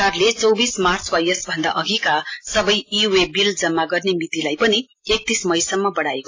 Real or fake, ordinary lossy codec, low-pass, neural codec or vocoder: real; AAC, 32 kbps; 7.2 kHz; none